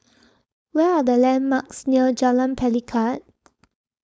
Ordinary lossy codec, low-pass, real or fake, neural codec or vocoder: none; none; fake; codec, 16 kHz, 4.8 kbps, FACodec